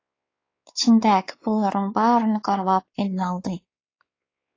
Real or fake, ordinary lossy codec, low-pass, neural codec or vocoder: fake; AAC, 32 kbps; 7.2 kHz; codec, 16 kHz, 4 kbps, X-Codec, WavLM features, trained on Multilingual LibriSpeech